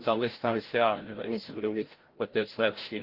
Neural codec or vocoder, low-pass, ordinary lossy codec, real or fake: codec, 16 kHz, 0.5 kbps, FreqCodec, larger model; 5.4 kHz; Opus, 16 kbps; fake